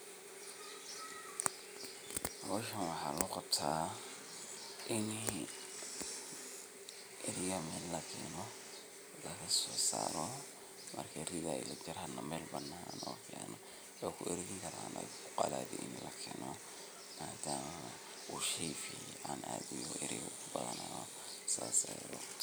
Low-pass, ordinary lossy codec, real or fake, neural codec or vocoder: none; none; real; none